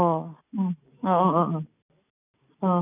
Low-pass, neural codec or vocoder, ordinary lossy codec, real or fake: 3.6 kHz; none; none; real